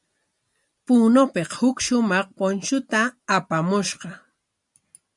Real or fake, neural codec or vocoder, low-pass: real; none; 10.8 kHz